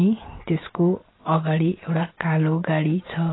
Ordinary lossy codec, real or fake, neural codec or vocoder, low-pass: AAC, 16 kbps; real; none; 7.2 kHz